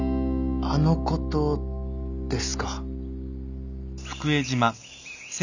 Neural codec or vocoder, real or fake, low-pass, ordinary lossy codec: none; real; 7.2 kHz; none